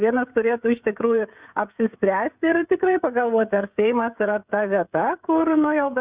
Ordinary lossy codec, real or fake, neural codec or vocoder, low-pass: Opus, 64 kbps; fake; codec, 16 kHz, 16 kbps, FreqCodec, smaller model; 3.6 kHz